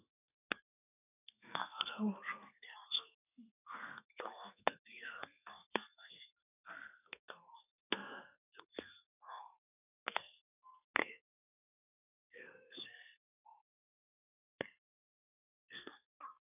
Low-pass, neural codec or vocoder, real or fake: 3.6 kHz; codec, 32 kHz, 1.9 kbps, SNAC; fake